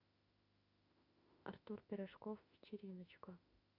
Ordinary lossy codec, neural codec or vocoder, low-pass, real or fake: Opus, 64 kbps; autoencoder, 48 kHz, 32 numbers a frame, DAC-VAE, trained on Japanese speech; 5.4 kHz; fake